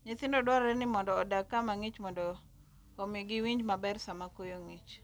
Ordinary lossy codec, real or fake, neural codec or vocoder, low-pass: none; real; none; none